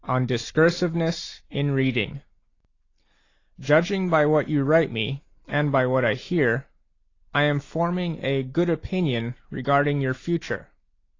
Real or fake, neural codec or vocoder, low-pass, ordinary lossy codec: real; none; 7.2 kHz; AAC, 32 kbps